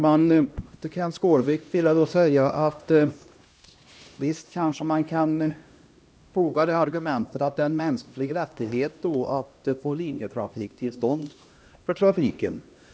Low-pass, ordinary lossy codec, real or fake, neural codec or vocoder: none; none; fake; codec, 16 kHz, 1 kbps, X-Codec, HuBERT features, trained on LibriSpeech